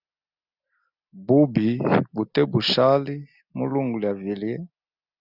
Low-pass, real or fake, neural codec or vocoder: 5.4 kHz; real; none